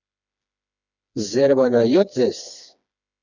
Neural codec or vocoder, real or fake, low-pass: codec, 16 kHz, 2 kbps, FreqCodec, smaller model; fake; 7.2 kHz